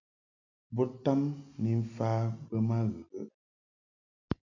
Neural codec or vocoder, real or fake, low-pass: none; real; 7.2 kHz